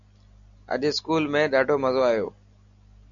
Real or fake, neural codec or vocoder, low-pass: real; none; 7.2 kHz